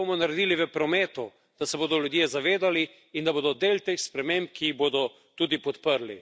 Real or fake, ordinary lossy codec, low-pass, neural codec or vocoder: real; none; none; none